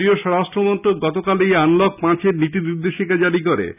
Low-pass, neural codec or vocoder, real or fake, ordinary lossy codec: 3.6 kHz; none; real; none